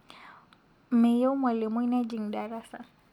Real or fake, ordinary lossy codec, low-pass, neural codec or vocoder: real; none; 19.8 kHz; none